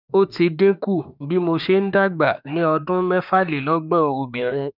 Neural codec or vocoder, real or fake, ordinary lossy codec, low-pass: autoencoder, 48 kHz, 32 numbers a frame, DAC-VAE, trained on Japanese speech; fake; none; 5.4 kHz